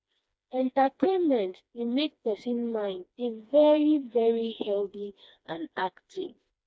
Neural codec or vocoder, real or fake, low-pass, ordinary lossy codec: codec, 16 kHz, 2 kbps, FreqCodec, smaller model; fake; none; none